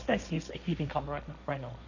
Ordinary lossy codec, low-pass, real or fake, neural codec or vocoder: none; 7.2 kHz; fake; codec, 16 kHz, 1.1 kbps, Voila-Tokenizer